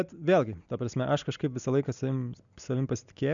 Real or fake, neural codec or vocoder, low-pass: real; none; 7.2 kHz